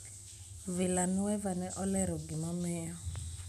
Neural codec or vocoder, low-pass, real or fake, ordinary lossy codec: none; 14.4 kHz; real; none